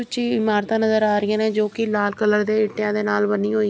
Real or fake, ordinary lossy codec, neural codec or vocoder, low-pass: real; none; none; none